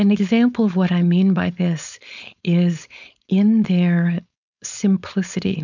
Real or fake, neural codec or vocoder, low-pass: fake; codec, 16 kHz, 4.8 kbps, FACodec; 7.2 kHz